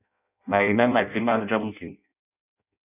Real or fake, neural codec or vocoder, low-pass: fake; codec, 16 kHz in and 24 kHz out, 0.6 kbps, FireRedTTS-2 codec; 3.6 kHz